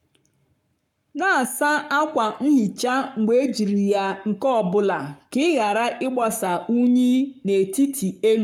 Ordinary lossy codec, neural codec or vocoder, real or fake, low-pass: none; codec, 44.1 kHz, 7.8 kbps, Pupu-Codec; fake; 19.8 kHz